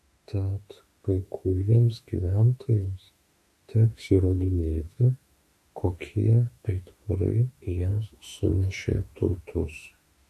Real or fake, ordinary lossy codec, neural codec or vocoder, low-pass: fake; AAC, 64 kbps; autoencoder, 48 kHz, 32 numbers a frame, DAC-VAE, trained on Japanese speech; 14.4 kHz